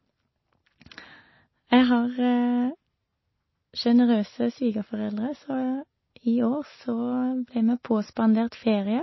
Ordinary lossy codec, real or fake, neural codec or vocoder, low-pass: MP3, 24 kbps; real; none; 7.2 kHz